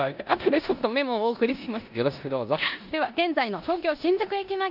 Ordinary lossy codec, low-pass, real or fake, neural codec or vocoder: none; 5.4 kHz; fake; codec, 16 kHz in and 24 kHz out, 0.9 kbps, LongCat-Audio-Codec, four codebook decoder